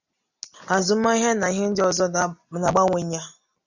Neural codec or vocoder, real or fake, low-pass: none; real; 7.2 kHz